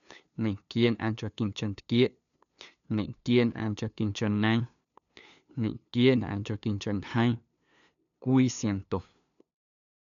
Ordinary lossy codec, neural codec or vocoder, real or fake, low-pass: MP3, 96 kbps; codec, 16 kHz, 2 kbps, FunCodec, trained on LibriTTS, 25 frames a second; fake; 7.2 kHz